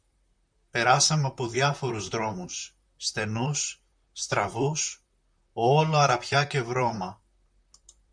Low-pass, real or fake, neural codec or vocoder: 9.9 kHz; fake; vocoder, 44.1 kHz, 128 mel bands, Pupu-Vocoder